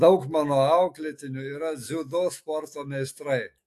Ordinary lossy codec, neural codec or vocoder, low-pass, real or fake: AAC, 64 kbps; autoencoder, 48 kHz, 128 numbers a frame, DAC-VAE, trained on Japanese speech; 14.4 kHz; fake